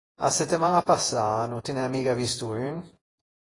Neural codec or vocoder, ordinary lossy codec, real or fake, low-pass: vocoder, 48 kHz, 128 mel bands, Vocos; AAC, 32 kbps; fake; 10.8 kHz